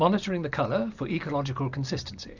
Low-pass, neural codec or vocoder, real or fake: 7.2 kHz; none; real